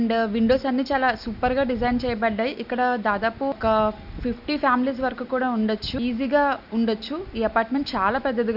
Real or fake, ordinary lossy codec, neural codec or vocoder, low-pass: real; none; none; 5.4 kHz